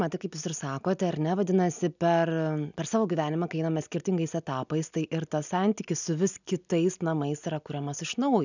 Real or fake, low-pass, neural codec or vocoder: real; 7.2 kHz; none